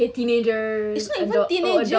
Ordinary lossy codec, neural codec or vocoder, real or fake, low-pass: none; none; real; none